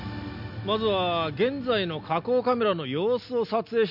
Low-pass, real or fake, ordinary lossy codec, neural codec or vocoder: 5.4 kHz; real; none; none